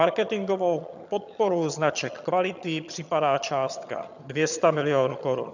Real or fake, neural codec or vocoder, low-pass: fake; vocoder, 22.05 kHz, 80 mel bands, HiFi-GAN; 7.2 kHz